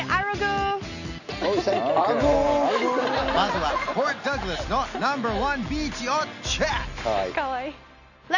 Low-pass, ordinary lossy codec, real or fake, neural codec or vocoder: 7.2 kHz; none; real; none